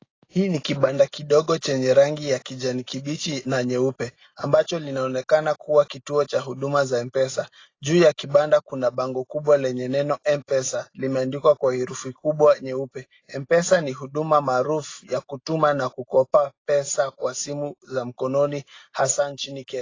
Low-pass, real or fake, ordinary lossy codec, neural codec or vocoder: 7.2 kHz; real; AAC, 32 kbps; none